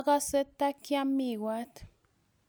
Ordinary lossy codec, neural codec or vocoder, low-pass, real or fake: none; none; none; real